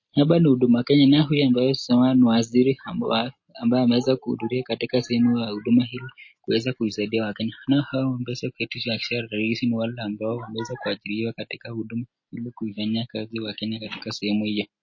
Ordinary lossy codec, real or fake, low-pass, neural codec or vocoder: MP3, 32 kbps; real; 7.2 kHz; none